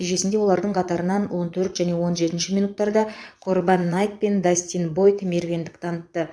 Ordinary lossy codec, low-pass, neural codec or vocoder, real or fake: none; none; vocoder, 22.05 kHz, 80 mel bands, Vocos; fake